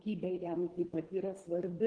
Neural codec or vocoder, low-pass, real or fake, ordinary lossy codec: codec, 24 kHz, 3 kbps, HILCodec; 9.9 kHz; fake; Opus, 16 kbps